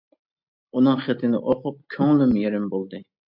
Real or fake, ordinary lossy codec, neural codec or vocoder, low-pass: real; MP3, 48 kbps; none; 5.4 kHz